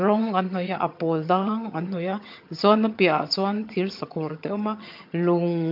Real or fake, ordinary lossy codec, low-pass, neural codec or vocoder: fake; MP3, 48 kbps; 5.4 kHz; vocoder, 22.05 kHz, 80 mel bands, HiFi-GAN